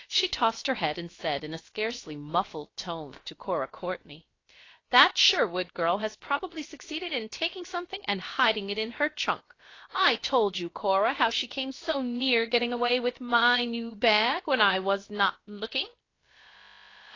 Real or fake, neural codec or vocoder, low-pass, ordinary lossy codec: fake; codec, 16 kHz, about 1 kbps, DyCAST, with the encoder's durations; 7.2 kHz; AAC, 32 kbps